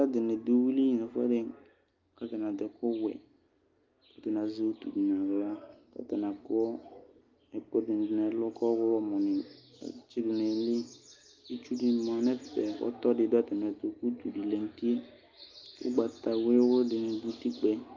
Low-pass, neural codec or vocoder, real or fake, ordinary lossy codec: 7.2 kHz; none; real; Opus, 32 kbps